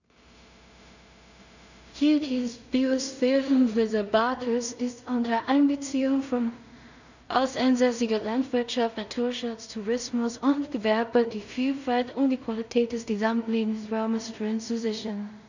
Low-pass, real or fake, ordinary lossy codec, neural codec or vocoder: 7.2 kHz; fake; none; codec, 16 kHz in and 24 kHz out, 0.4 kbps, LongCat-Audio-Codec, two codebook decoder